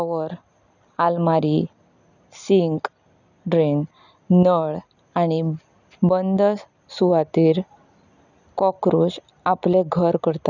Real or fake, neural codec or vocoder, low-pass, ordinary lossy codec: real; none; 7.2 kHz; none